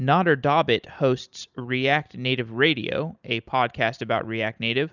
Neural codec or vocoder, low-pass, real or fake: none; 7.2 kHz; real